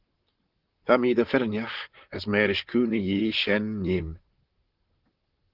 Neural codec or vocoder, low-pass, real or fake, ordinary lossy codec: vocoder, 44.1 kHz, 128 mel bands, Pupu-Vocoder; 5.4 kHz; fake; Opus, 16 kbps